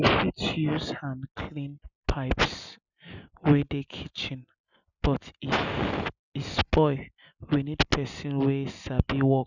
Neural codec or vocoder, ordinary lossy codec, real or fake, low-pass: none; none; real; 7.2 kHz